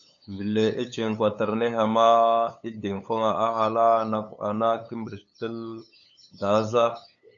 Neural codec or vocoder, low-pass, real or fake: codec, 16 kHz, 8 kbps, FunCodec, trained on LibriTTS, 25 frames a second; 7.2 kHz; fake